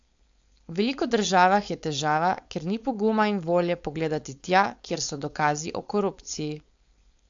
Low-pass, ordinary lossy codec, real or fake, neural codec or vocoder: 7.2 kHz; AAC, 64 kbps; fake; codec, 16 kHz, 4.8 kbps, FACodec